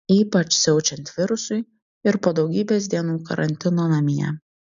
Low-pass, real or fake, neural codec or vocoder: 7.2 kHz; real; none